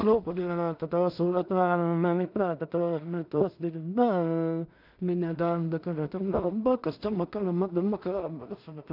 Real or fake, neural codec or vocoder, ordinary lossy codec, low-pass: fake; codec, 16 kHz in and 24 kHz out, 0.4 kbps, LongCat-Audio-Codec, two codebook decoder; none; 5.4 kHz